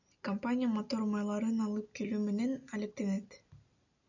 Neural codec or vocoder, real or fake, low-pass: none; real; 7.2 kHz